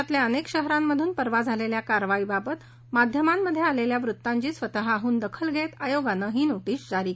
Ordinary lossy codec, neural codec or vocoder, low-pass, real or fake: none; none; none; real